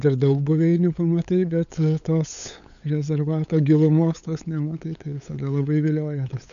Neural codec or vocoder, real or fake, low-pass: codec, 16 kHz, 8 kbps, FunCodec, trained on LibriTTS, 25 frames a second; fake; 7.2 kHz